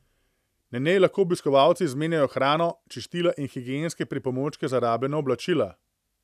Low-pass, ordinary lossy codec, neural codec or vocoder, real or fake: 14.4 kHz; none; none; real